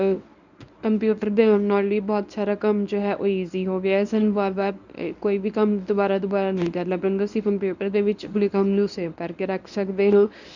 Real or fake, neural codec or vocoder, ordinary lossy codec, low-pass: fake; codec, 24 kHz, 0.9 kbps, WavTokenizer, medium speech release version 1; none; 7.2 kHz